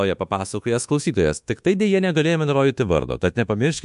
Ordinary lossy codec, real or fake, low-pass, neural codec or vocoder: MP3, 64 kbps; fake; 10.8 kHz; codec, 24 kHz, 1.2 kbps, DualCodec